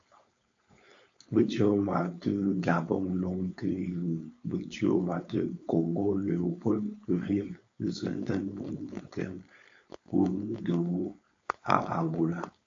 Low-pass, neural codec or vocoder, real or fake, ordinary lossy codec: 7.2 kHz; codec, 16 kHz, 4.8 kbps, FACodec; fake; AAC, 48 kbps